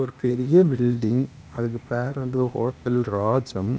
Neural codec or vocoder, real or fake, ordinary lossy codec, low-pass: codec, 16 kHz, 0.8 kbps, ZipCodec; fake; none; none